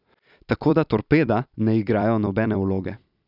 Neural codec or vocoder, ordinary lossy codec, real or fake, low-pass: vocoder, 44.1 kHz, 128 mel bands every 256 samples, BigVGAN v2; none; fake; 5.4 kHz